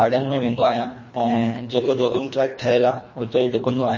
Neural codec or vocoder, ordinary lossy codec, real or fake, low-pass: codec, 24 kHz, 1.5 kbps, HILCodec; MP3, 32 kbps; fake; 7.2 kHz